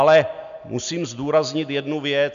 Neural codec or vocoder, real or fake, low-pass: none; real; 7.2 kHz